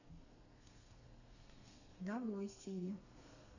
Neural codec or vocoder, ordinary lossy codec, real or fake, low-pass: codec, 32 kHz, 1.9 kbps, SNAC; none; fake; 7.2 kHz